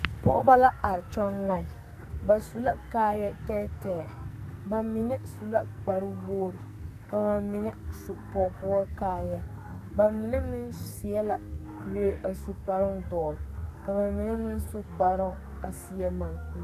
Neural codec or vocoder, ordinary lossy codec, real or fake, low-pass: codec, 44.1 kHz, 2.6 kbps, SNAC; MP3, 96 kbps; fake; 14.4 kHz